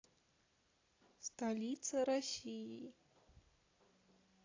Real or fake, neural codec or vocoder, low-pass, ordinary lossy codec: real; none; 7.2 kHz; AAC, 32 kbps